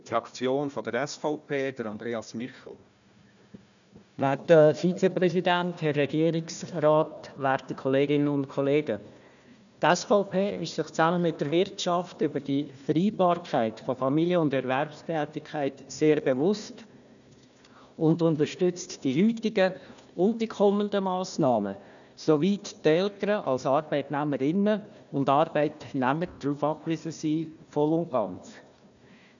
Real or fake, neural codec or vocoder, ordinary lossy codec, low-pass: fake; codec, 16 kHz, 1 kbps, FunCodec, trained on Chinese and English, 50 frames a second; none; 7.2 kHz